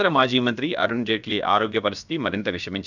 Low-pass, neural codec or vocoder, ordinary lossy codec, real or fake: 7.2 kHz; codec, 16 kHz, about 1 kbps, DyCAST, with the encoder's durations; none; fake